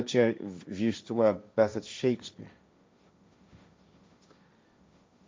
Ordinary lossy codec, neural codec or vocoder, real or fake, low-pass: none; codec, 16 kHz, 1.1 kbps, Voila-Tokenizer; fake; none